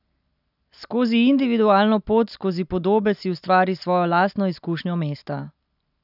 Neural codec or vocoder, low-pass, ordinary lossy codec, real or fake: none; 5.4 kHz; none; real